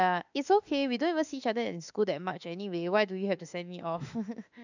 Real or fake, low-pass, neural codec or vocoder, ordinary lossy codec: fake; 7.2 kHz; autoencoder, 48 kHz, 32 numbers a frame, DAC-VAE, trained on Japanese speech; none